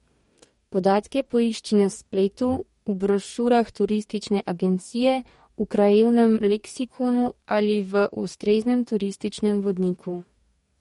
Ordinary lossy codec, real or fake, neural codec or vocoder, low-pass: MP3, 48 kbps; fake; codec, 44.1 kHz, 2.6 kbps, DAC; 19.8 kHz